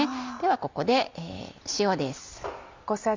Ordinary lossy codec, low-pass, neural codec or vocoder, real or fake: MP3, 64 kbps; 7.2 kHz; none; real